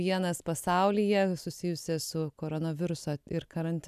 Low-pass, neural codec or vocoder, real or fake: 14.4 kHz; none; real